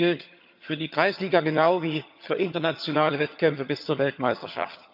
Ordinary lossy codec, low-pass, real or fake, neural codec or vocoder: none; 5.4 kHz; fake; vocoder, 22.05 kHz, 80 mel bands, HiFi-GAN